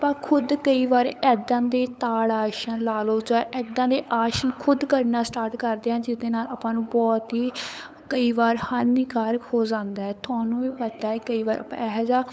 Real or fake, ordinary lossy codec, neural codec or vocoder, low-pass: fake; none; codec, 16 kHz, 8 kbps, FunCodec, trained on LibriTTS, 25 frames a second; none